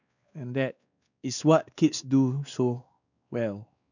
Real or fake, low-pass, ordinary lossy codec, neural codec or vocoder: fake; 7.2 kHz; none; codec, 16 kHz, 2 kbps, X-Codec, WavLM features, trained on Multilingual LibriSpeech